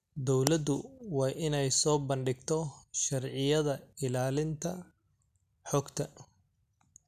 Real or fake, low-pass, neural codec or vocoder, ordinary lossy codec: real; 14.4 kHz; none; none